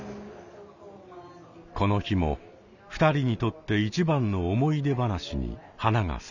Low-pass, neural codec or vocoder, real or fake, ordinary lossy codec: 7.2 kHz; none; real; none